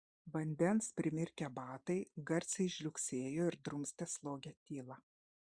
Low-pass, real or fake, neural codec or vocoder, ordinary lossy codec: 9.9 kHz; fake; vocoder, 44.1 kHz, 128 mel bands every 512 samples, BigVGAN v2; Opus, 64 kbps